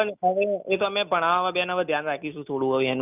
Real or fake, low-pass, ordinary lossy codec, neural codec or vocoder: real; 3.6 kHz; none; none